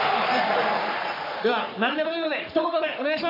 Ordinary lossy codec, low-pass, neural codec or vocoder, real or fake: MP3, 48 kbps; 5.4 kHz; codec, 44.1 kHz, 3.4 kbps, Pupu-Codec; fake